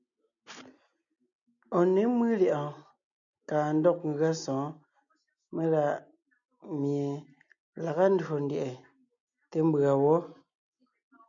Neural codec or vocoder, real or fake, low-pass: none; real; 7.2 kHz